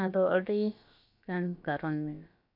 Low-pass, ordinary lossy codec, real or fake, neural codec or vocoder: 5.4 kHz; MP3, 48 kbps; fake; codec, 16 kHz, about 1 kbps, DyCAST, with the encoder's durations